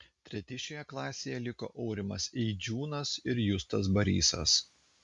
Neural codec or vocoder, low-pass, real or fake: none; 10.8 kHz; real